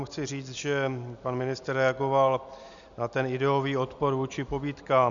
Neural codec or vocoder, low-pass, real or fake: none; 7.2 kHz; real